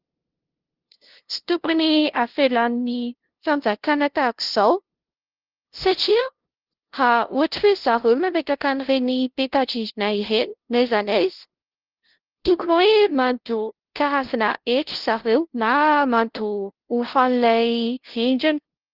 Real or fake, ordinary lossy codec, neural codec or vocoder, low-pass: fake; Opus, 16 kbps; codec, 16 kHz, 0.5 kbps, FunCodec, trained on LibriTTS, 25 frames a second; 5.4 kHz